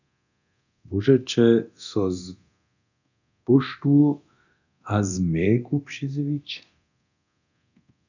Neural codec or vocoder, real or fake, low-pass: codec, 24 kHz, 0.9 kbps, DualCodec; fake; 7.2 kHz